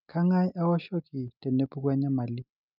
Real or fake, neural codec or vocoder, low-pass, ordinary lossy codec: real; none; 5.4 kHz; none